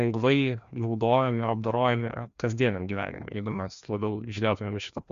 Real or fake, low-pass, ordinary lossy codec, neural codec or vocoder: fake; 7.2 kHz; Opus, 64 kbps; codec, 16 kHz, 1 kbps, FreqCodec, larger model